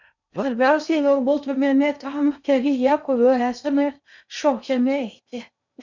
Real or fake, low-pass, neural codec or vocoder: fake; 7.2 kHz; codec, 16 kHz in and 24 kHz out, 0.6 kbps, FocalCodec, streaming, 2048 codes